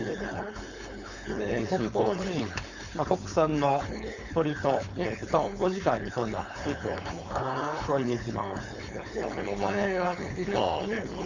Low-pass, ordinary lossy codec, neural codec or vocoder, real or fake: 7.2 kHz; Opus, 64 kbps; codec, 16 kHz, 4.8 kbps, FACodec; fake